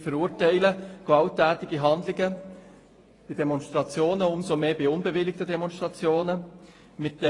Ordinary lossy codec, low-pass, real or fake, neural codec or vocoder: AAC, 32 kbps; 10.8 kHz; fake; vocoder, 24 kHz, 100 mel bands, Vocos